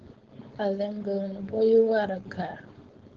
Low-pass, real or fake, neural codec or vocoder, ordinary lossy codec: 7.2 kHz; fake; codec, 16 kHz, 4.8 kbps, FACodec; Opus, 16 kbps